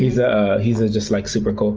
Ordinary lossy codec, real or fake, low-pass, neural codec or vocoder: Opus, 24 kbps; real; 7.2 kHz; none